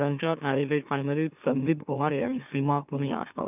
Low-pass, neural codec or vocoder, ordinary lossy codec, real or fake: 3.6 kHz; autoencoder, 44.1 kHz, a latent of 192 numbers a frame, MeloTTS; none; fake